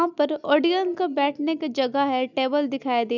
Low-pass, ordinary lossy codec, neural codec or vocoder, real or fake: 7.2 kHz; none; none; real